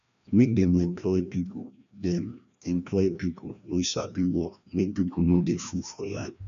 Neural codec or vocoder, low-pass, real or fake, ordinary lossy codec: codec, 16 kHz, 1 kbps, FreqCodec, larger model; 7.2 kHz; fake; none